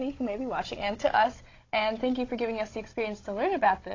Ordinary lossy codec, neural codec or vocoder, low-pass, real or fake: AAC, 32 kbps; codec, 16 kHz, 16 kbps, FunCodec, trained on Chinese and English, 50 frames a second; 7.2 kHz; fake